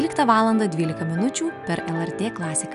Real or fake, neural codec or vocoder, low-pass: real; none; 10.8 kHz